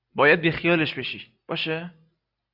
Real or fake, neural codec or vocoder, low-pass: real; none; 5.4 kHz